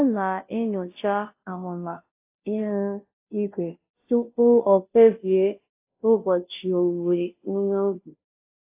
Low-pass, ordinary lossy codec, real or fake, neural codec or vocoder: 3.6 kHz; AAC, 24 kbps; fake; codec, 16 kHz, 0.5 kbps, FunCodec, trained on Chinese and English, 25 frames a second